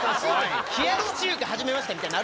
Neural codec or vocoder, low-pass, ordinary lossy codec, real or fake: none; none; none; real